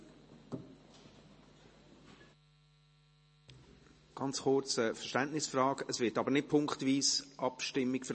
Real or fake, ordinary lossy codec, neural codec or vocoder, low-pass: real; MP3, 32 kbps; none; 10.8 kHz